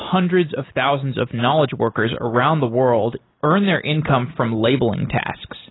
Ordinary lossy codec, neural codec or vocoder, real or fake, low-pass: AAC, 16 kbps; none; real; 7.2 kHz